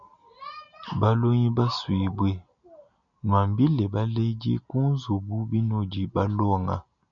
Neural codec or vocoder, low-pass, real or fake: none; 7.2 kHz; real